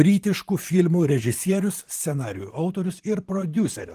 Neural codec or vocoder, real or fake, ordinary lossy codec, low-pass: vocoder, 44.1 kHz, 128 mel bands, Pupu-Vocoder; fake; Opus, 24 kbps; 14.4 kHz